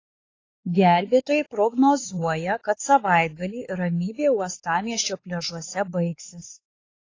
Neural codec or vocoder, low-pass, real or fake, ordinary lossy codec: codec, 16 kHz, 4 kbps, X-Codec, WavLM features, trained on Multilingual LibriSpeech; 7.2 kHz; fake; AAC, 32 kbps